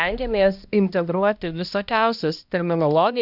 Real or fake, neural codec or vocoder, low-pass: fake; codec, 16 kHz, 1 kbps, X-Codec, HuBERT features, trained on balanced general audio; 5.4 kHz